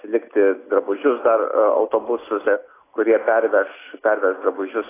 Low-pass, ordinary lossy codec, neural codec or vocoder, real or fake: 3.6 kHz; AAC, 16 kbps; none; real